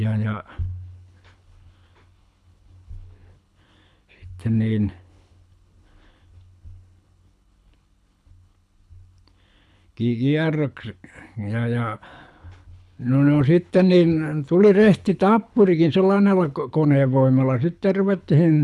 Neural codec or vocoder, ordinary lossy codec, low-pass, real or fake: codec, 24 kHz, 6 kbps, HILCodec; none; none; fake